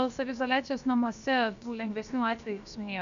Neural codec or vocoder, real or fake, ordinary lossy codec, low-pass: codec, 16 kHz, about 1 kbps, DyCAST, with the encoder's durations; fake; AAC, 96 kbps; 7.2 kHz